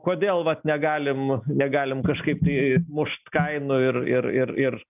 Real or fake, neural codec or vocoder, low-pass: real; none; 3.6 kHz